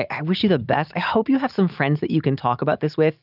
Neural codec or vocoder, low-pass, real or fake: none; 5.4 kHz; real